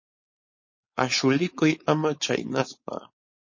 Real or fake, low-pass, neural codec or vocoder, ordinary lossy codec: fake; 7.2 kHz; codec, 16 kHz, 4.8 kbps, FACodec; MP3, 32 kbps